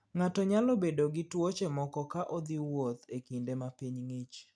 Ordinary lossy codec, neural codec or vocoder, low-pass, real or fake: none; none; none; real